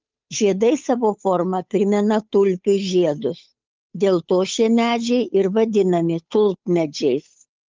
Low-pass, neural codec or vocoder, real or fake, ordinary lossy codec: 7.2 kHz; codec, 16 kHz, 8 kbps, FunCodec, trained on Chinese and English, 25 frames a second; fake; Opus, 32 kbps